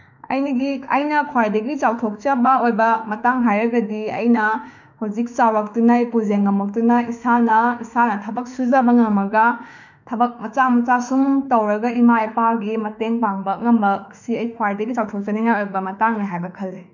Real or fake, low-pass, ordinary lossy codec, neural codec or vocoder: fake; 7.2 kHz; none; autoencoder, 48 kHz, 32 numbers a frame, DAC-VAE, trained on Japanese speech